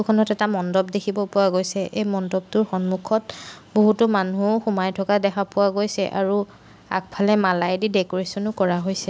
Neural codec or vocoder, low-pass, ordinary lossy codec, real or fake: none; none; none; real